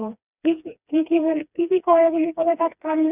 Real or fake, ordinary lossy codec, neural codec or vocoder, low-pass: fake; none; codec, 16 kHz, 2 kbps, FreqCodec, smaller model; 3.6 kHz